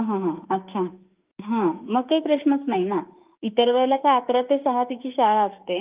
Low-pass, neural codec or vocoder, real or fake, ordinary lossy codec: 3.6 kHz; autoencoder, 48 kHz, 32 numbers a frame, DAC-VAE, trained on Japanese speech; fake; Opus, 32 kbps